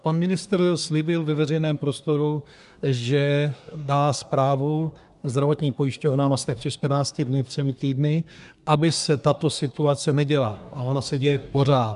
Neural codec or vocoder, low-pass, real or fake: codec, 24 kHz, 1 kbps, SNAC; 10.8 kHz; fake